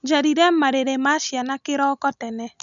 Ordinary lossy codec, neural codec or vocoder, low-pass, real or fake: none; none; 7.2 kHz; real